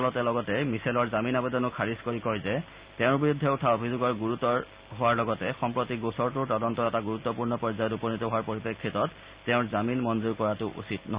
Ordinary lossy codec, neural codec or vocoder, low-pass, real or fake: Opus, 64 kbps; none; 3.6 kHz; real